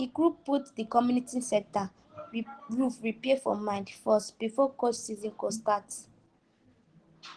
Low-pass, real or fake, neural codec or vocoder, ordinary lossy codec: 9.9 kHz; real; none; Opus, 16 kbps